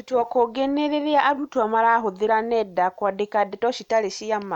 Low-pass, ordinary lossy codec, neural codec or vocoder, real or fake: 19.8 kHz; none; none; real